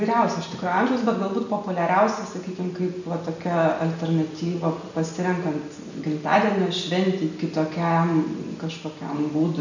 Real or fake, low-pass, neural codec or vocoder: real; 7.2 kHz; none